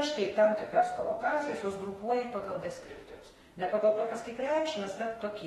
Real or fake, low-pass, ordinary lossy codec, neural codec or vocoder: fake; 19.8 kHz; AAC, 32 kbps; autoencoder, 48 kHz, 32 numbers a frame, DAC-VAE, trained on Japanese speech